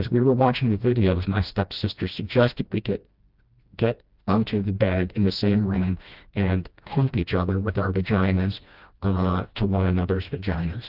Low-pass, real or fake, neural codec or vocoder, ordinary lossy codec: 5.4 kHz; fake; codec, 16 kHz, 1 kbps, FreqCodec, smaller model; Opus, 32 kbps